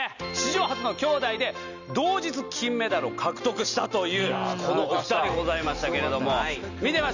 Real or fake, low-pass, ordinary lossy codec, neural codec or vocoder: real; 7.2 kHz; none; none